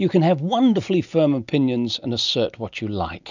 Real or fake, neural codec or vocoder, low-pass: real; none; 7.2 kHz